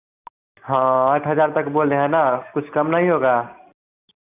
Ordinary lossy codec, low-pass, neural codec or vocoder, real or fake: none; 3.6 kHz; none; real